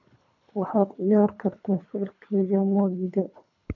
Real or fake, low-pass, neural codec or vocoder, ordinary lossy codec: fake; 7.2 kHz; codec, 24 kHz, 3 kbps, HILCodec; none